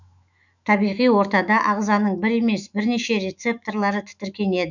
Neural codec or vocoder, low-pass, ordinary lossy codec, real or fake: autoencoder, 48 kHz, 128 numbers a frame, DAC-VAE, trained on Japanese speech; 7.2 kHz; none; fake